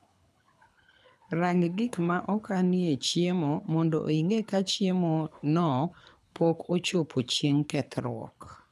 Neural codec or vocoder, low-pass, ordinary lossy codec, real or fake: codec, 24 kHz, 6 kbps, HILCodec; none; none; fake